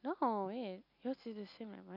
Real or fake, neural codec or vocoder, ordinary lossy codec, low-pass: real; none; MP3, 48 kbps; 5.4 kHz